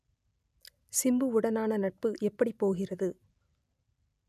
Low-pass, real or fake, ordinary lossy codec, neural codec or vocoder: 14.4 kHz; real; none; none